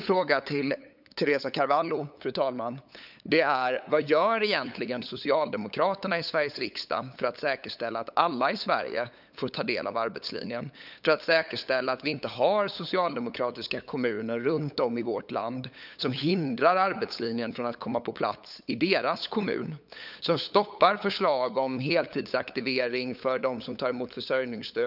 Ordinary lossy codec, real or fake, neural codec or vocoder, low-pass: none; fake; codec, 16 kHz, 8 kbps, FunCodec, trained on LibriTTS, 25 frames a second; 5.4 kHz